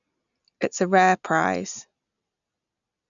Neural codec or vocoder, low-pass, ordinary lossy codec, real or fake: none; 7.2 kHz; none; real